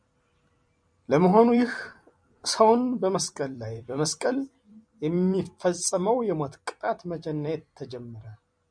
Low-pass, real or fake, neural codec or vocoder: 9.9 kHz; fake; vocoder, 44.1 kHz, 128 mel bands every 256 samples, BigVGAN v2